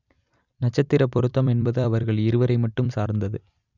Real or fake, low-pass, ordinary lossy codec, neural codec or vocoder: fake; 7.2 kHz; none; vocoder, 44.1 kHz, 128 mel bands every 256 samples, BigVGAN v2